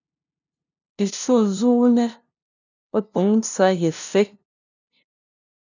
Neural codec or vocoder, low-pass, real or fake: codec, 16 kHz, 0.5 kbps, FunCodec, trained on LibriTTS, 25 frames a second; 7.2 kHz; fake